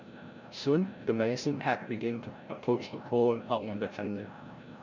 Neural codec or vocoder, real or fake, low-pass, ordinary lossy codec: codec, 16 kHz, 0.5 kbps, FreqCodec, larger model; fake; 7.2 kHz; none